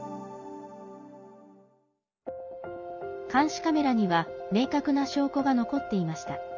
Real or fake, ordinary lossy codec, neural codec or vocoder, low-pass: real; none; none; 7.2 kHz